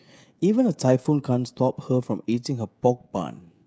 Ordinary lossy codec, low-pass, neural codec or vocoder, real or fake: none; none; codec, 16 kHz, 16 kbps, FreqCodec, smaller model; fake